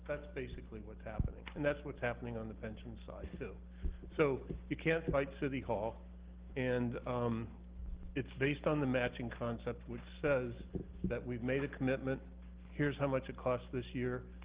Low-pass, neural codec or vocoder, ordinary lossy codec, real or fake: 3.6 kHz; none; Opus, 16 kbps; real